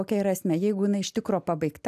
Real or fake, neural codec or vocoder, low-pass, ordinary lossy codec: real; none; 14.4 kHz; AAC, 96 kbps